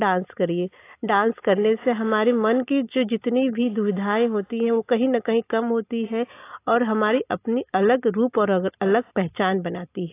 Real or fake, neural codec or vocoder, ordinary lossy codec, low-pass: real; none; AAC, 24 kbps; 3.6 kHz